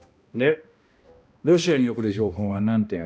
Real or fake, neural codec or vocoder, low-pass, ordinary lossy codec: fake; codec, 16 kHz, 1 kbps, X-Codec, HuBERT features, trained on balanced general audio; none; none